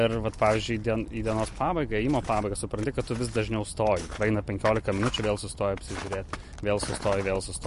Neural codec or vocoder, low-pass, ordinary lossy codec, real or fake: none; 14.4 kHz; MP3, 48 kbps; real